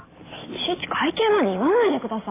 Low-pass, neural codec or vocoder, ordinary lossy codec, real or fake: 3.6 kHz; none; AAC, 16 kbps; real